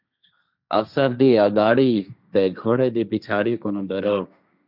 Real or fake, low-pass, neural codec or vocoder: fake; 5.4 kHz; codec, 16 kHz, 1.1 kbps, Voila-Tokenizer